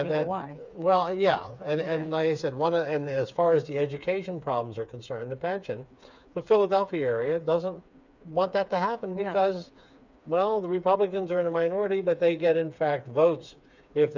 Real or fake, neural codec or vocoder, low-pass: fake; codec, 16 kHz, 4 kbps, FreqCodec, smaller model; 7.2 kHz